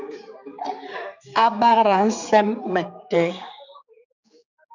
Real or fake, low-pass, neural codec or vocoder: fake; 7.2 kHz; codec, 16 kHz, 4 kbps, X-Codec, HuBERT features, trained on general audio